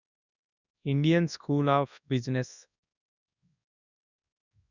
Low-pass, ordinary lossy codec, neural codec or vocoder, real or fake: 7.2 kHz; none; codec, 24 kHz, 0.9 kbps, WavTokenizer, large speech release; fake